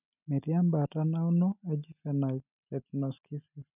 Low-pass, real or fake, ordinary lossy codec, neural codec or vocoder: 3.6 kHz; real; none; none